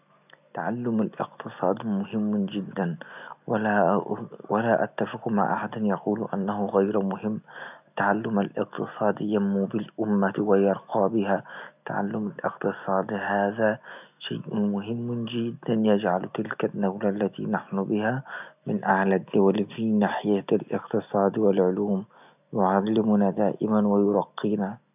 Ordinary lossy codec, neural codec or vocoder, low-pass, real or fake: none; none; 3.6 kHz; real